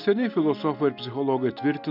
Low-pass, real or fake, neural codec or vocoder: 5.4 kHz; real; none